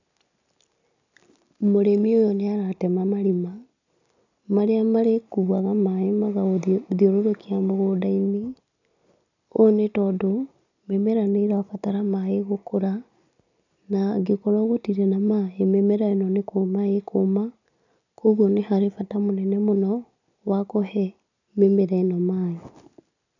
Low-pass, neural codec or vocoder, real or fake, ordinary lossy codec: 7.2 kHz; none; real; none